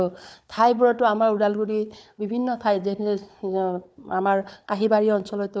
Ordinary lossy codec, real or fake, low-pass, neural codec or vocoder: none; fake; none; codec, 16 kHz, 4 kbps, FunCodec, trained on Chinese and English, 50 frames a second